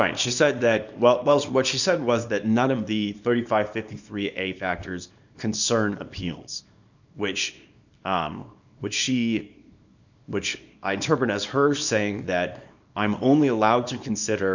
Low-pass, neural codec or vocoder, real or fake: 7.2 kHz; codec, 24 kHz, 0.9 kbps, WavTokenizer, small release; fake